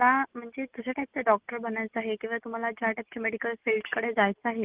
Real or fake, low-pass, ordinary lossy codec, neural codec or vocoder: real; 3.6 kHz; Opus, 16 kbps; none